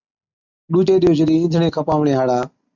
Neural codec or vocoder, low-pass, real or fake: none; 7.2 kHz; real